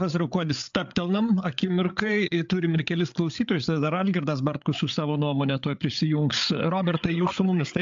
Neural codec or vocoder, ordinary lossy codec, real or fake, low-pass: codec, 16 kHz, 8 kbps, FreqCodec, larger model; AAC, 64 kbps; fake; 7.2 kHz